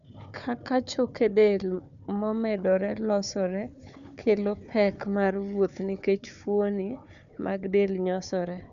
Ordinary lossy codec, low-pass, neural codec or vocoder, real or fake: none; 7.2 kHz; codec, 16 kHz, 4 kbps, FunCodec, trained on Chinese and English, 50 frames a second; fake